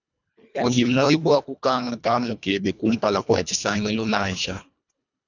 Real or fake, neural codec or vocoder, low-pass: fake; codec, 24 kHz, 1.5 kbps, HILCodec; 7.2 kHz